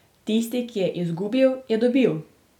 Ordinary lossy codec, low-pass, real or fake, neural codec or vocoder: none; 19.8 kHz; real; none